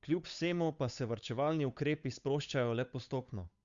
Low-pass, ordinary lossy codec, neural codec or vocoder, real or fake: 7.2 kHz; Opus, 64 kbps; codec, 16 kHz, 8 kbps, FunCodec, trained on Chinese and English, 25 frames a second; fake